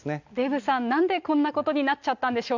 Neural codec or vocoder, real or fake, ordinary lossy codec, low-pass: none; real; none; 7.2 kHz